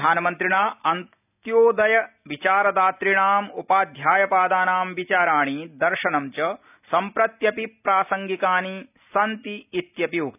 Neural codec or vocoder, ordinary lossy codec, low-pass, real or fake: none; none; 3.6 kHz; real